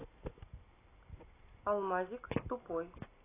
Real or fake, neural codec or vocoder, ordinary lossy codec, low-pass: real; none; AAC, 16 kbps; 3.6 kHz